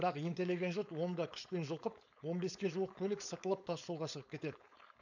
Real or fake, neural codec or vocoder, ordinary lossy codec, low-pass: fake; codec, 16 kHz, 4.8 kbps, FACodec; none; 7.2 kHz